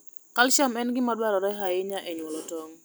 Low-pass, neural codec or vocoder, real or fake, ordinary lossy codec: none; none; real; none